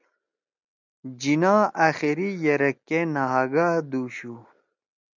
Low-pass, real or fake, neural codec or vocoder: 7.2 kHz; real; none